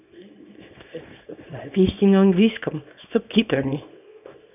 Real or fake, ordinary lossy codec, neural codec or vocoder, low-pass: fake; none; codec, 24 kHz, 0.9 kbps, WavTokenizer, small release; 3.6 kHz